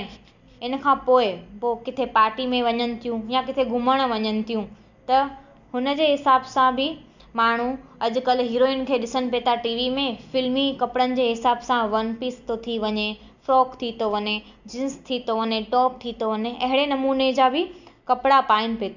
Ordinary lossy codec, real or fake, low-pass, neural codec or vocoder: none; real; 7.2 kHz; none